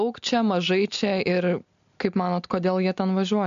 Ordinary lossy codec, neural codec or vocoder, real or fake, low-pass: AAC, 64 kbps; none; real; 7.2 kHz